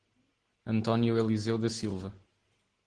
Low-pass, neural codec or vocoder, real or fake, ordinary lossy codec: 9.9 kHz; none; real; Opus, 16 kbps